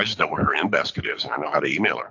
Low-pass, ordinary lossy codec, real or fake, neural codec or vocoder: 7.2 kHz; AAC, 48 kbps; fake; codec, 24 kHz, 6 kbps, HILCodec